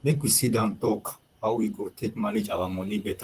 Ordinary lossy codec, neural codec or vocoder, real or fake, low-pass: Opus, 24 kbps; vocoder, 44.1 kHz, 128 mel bands, Pupu-Vocoder; fake; 14.4 kHz